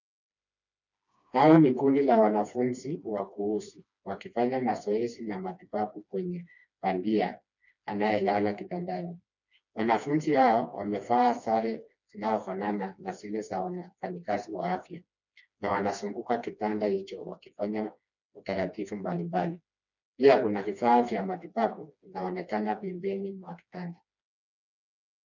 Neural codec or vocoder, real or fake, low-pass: codec, 16 kHz, 2 kbps, FreqCodec, smaller model; fake; 7.2 kHz